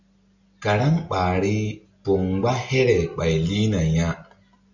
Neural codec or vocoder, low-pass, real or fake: none; 7.2 kHz; real